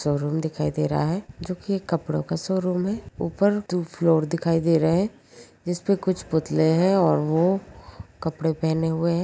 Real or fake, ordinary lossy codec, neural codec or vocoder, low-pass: real; none; none; none